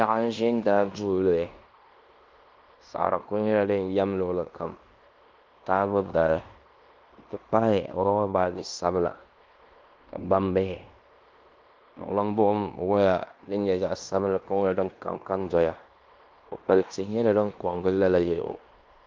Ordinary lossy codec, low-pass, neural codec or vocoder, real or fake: Opus, 24 kbps; 7.2 kHz; codec, 16 kHz in and 24 kHz out, 0.9 kbps, LongCat-Audio-Codec, fine tuned four codebook decoder; fake